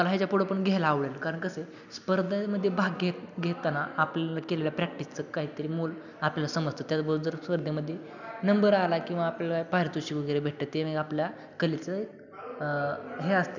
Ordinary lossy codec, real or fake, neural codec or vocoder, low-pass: none; real; none; 7.2 kHz